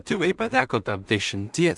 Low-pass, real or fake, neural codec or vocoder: 10.8 kHz; fake; codec, 16 kHz in and 24 kHz out, 0.4 kbps, LongCat-Audio-Codec, two codebook decoder